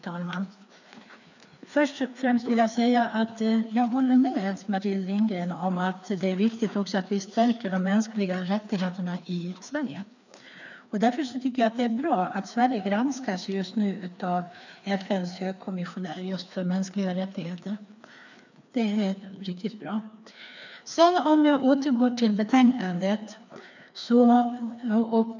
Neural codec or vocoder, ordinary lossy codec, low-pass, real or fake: codec, 16 kHz, 2 kbps, FreqCodec, larger model; none; 7.2 kHz; fake